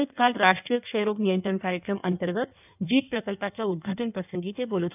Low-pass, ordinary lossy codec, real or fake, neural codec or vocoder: 3.6 kHz; none; fake; codec, 16 kHz in and 24 kHz out, 1.1 kbps, FireRedTTS-2 codec